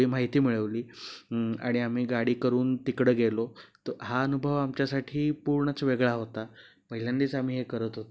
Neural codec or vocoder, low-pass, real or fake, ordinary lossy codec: none; none; real; none